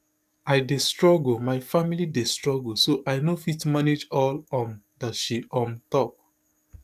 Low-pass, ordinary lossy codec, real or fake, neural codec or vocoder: 14.4 kHz; none; fake; codec, 44.1 kHz, 7.8 kbps, DAC